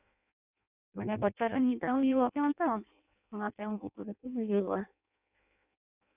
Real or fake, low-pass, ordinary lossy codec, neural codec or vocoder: fake; 3.6 kHz; none; codec, 16 kHz in and 24 kHz out, 0.6 kbps, FireRedTTS-2 codec